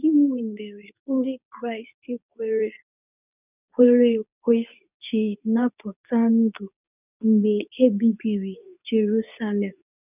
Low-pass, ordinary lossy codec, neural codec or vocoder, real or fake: 3.6 kHz; none; codec, 24 kHz, 0.9 kbps, WavTokenizer, medium speech release version 2; fake